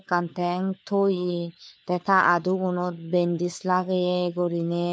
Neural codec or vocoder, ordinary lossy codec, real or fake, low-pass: codec, 16 kHz, 4 kbps, FunCodec, trained on LibriTTS, 50 frames a second; none; fake; none